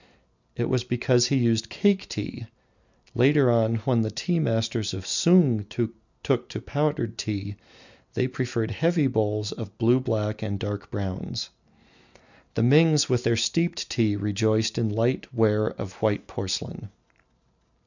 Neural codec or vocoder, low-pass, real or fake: none; 7.2 kHz; real